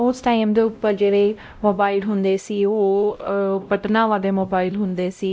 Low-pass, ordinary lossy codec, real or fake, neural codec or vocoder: none; none; fake; codec, 16 kHz, 0.5 kbps, X-Codec, WavLM features, trained on Multilingual LibriSpeech